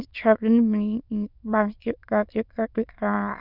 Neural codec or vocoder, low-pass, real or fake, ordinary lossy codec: autoencoder, 22.05 kHz, a latent of 192 numbers a frame, VITS, trained on many speakers; 5.4 kHz; fake; none